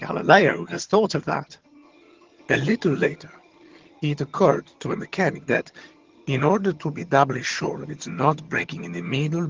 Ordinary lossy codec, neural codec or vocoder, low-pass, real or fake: Opus, 16 kbps; vocoder, 22.05 kHz, 80 mel bands, HiFi-GAN; 7.2 kHz; fake